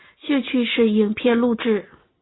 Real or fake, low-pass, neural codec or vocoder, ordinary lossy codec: real; 7.2 kHz; none; AAC, 16 kbps